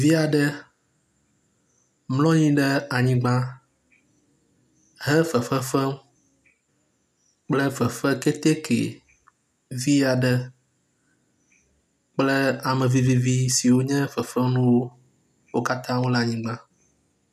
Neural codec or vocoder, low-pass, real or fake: none; 14.4 kHz; real